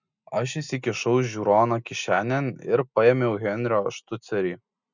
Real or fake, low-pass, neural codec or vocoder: real; 7.2 kHz; none